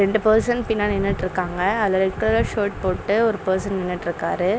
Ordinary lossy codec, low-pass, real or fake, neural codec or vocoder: none; none; real; none